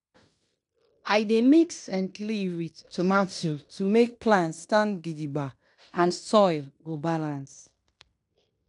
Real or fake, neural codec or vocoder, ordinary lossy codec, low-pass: fake; codec, 16 kHz in and 24 kHz out, 0.9 kbps, LongCat-Audio-Codec, fine tuned four codebook decoder; none; 10.8 kHz